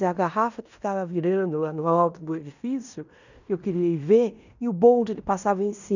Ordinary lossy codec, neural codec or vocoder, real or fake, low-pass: none; codec, 16 kHz in and 24 kHz out, 0.9 kbps, LongCat-Audio-Codec, fine tuned four codebook decoder; fake; 7.2 kHz